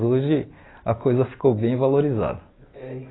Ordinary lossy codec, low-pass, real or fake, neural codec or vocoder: AAC, 16 kbps; 7.2 kHz; real; none